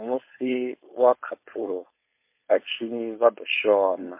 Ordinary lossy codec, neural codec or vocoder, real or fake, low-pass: MP3, 24 kbps; codec, 16 kHz, 4.8 kbps, FACodec; fake; 3.6 kHz